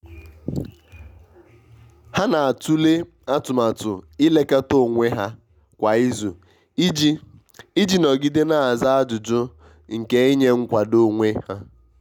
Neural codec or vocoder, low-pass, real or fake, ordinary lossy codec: none; 19.8 kHz; real; none